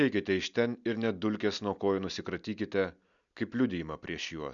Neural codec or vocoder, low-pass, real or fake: none; 7.2 kHz; real